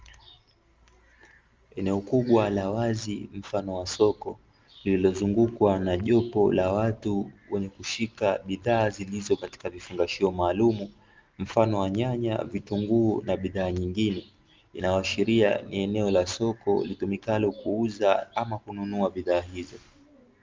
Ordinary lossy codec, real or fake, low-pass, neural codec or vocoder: Opus, 32 kbps; real; 7.2 kHz; none